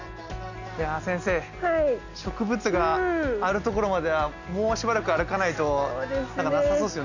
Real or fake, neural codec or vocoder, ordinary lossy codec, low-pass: real; none; none; 7.2 kHz